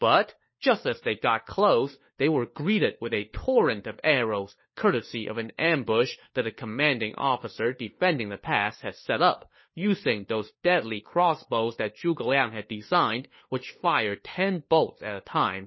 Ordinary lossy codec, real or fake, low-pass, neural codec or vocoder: MP3, 24 kbps; fake; 7.2 kHz; codec, 16 kHz, 4 kbps, FunCodec, trained on LibriTTS, 50 frames a second